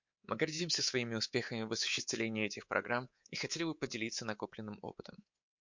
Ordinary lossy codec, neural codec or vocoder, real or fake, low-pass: MP3, 48 kbps; codec, 24 kHz, 3.1 kbps, DualCodec; fake; 7.2 kHz